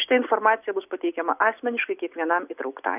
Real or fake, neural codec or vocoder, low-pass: real; none; 3.6 kHz